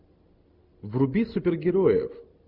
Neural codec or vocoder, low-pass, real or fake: none; 5.4 kHz; real